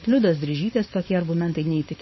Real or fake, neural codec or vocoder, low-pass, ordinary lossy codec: fake; codec, 16 kHz, 4.8 kbps, FACodec; 7.2 kHz; MP3, 24 kbps